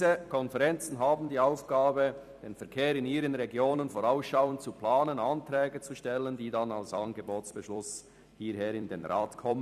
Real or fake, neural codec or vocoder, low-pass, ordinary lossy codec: fake; vocoder, 44.1 kHz, 128 mel bands every 256 samples, BigVGAN v2; 14.4 kHz; none